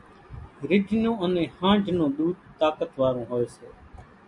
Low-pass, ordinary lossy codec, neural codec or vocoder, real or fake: 10.8 kHz; MP3, 64 kbps; none; real